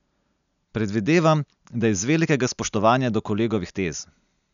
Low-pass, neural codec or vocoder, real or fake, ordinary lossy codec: 7.2 kHz; none; real; none